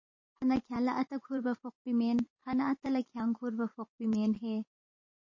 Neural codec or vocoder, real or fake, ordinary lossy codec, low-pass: vocoder, 44.1 kHz, 128 mel bands every 512 samples, BigVGAN v2; fake; MP3, 32 kbps; 7.2 kHz